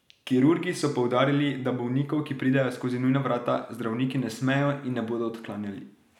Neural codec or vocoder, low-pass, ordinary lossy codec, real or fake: none; 19.8 kHz; none; real